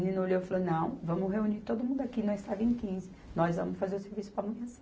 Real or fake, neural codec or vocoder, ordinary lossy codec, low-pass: real; none; none; none